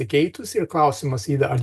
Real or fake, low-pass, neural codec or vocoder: real; 14.4 kHz; none